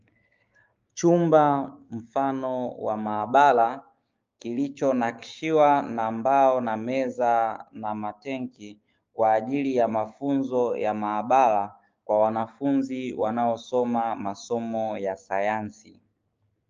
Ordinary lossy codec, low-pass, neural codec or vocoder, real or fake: Opus, 24 kbps; 7.2 kHz; codec, 16 kHz, 6 kbps, DAC; fake